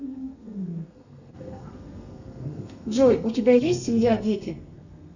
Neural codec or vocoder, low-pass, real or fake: codec, 32 kHz, 1.9 kbps, SNAC; 7.2 kHz; fake